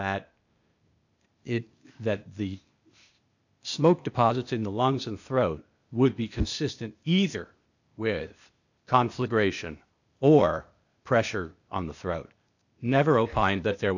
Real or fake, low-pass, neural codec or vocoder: fake; 7.2 kHz; codec, 16 kHz, 0.8 kbps, ZipCodec